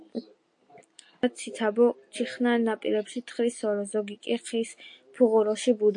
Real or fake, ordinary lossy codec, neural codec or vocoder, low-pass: real; AAC, 64 kbps; none; 9.9 kHz